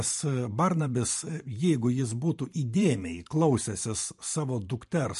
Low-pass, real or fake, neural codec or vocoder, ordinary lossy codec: 14.4 kHz; fake; vocoder, 44.1 kHz, 128 mel bands every 512 samples, BigVGAN v2; MP3, 48 kbps